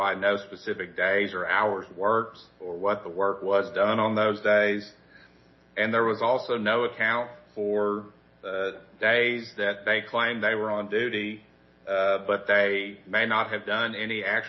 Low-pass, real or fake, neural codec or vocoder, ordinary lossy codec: 7.2 kHz; real; none; MP3, 24 kbps